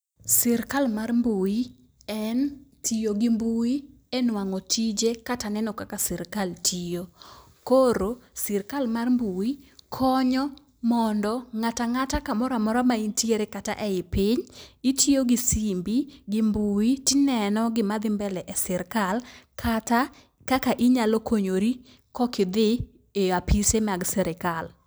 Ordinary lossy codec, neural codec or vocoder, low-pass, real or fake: none; none; none; real